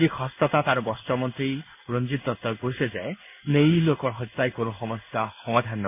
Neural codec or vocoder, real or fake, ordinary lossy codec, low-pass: codec, 16 kHz in and 24 kHz out, 1 kbps, XY-Tokenizer; fake; none; 3.6 kHz